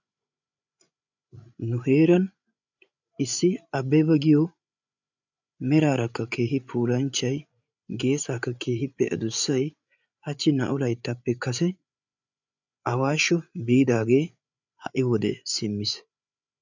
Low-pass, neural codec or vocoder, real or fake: 7.2 kHz; codec, 16 kHz, 8 kbps, FreqCodec, larger model; fake